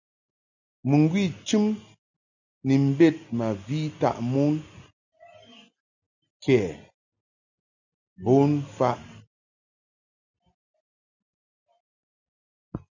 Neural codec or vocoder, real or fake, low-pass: none; real; 7.2 kHz